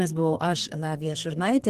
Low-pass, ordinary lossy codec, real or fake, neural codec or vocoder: 14.4 kHz; Opus, 16 kbps; fake; codec, 32 kHz, 1.9 kbps, SNAC